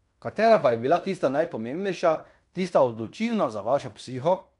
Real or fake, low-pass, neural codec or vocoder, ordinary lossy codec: fake; 10.8 kHz; codec, 16 kHz in and 24 kHz out, 0.9 kbps, LongCat-Audio-Codec, fine tuned four codebook decoder; none